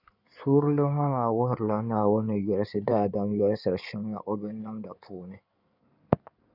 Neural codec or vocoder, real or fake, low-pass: codec, 16 kHz in and 24 kHz out, 2.2 kbps, FireRedTTS-2 codec; fake; 5.4 kHz